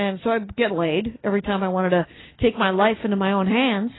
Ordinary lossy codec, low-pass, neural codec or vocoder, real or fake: AAC, 16 kbps; 7.2 kHz; none; real